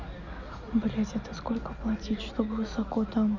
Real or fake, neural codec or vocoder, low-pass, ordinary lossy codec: real; none; 7.2 kHz; none